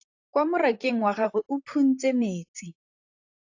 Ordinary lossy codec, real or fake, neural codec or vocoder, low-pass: AAC, 48 kbps; fake; vocoder, 44.1 kHz, 128 mel bands, Pupu-Vocoder; 7.2 kHz